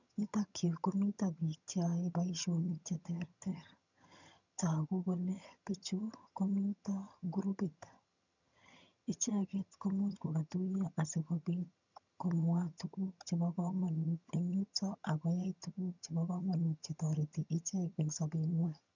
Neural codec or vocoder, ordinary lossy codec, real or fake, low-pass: vocoder, 22.05 kHz, 80 mel bands, HiFi-GAN; none; fake; 7.2 kHz